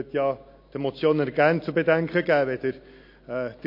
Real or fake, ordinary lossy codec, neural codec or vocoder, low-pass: real; MP3, 32 kbps; none; 5.4 kHz